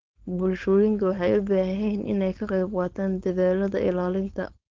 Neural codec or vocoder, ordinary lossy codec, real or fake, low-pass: codec, 16 kHz, 4.8 kbps, FACodec; Opus, 16 kbps; fake; 7.2 kHz